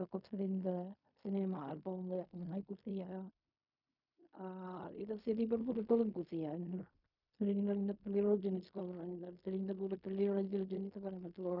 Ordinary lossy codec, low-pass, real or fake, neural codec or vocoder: none; 5.4 kHz; fake; codec, 16 kHz in and 24 kHz out, 0.4 kbps, LongCat-Audio-Codec, fine tuned four codebook decoder